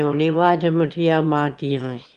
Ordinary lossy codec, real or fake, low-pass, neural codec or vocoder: Opus, 24 kbps; fake; 9.9 kHz; autoencoder, 22.05 kHz, a latent of 192 numbers a frame, VITS, trained on one speaker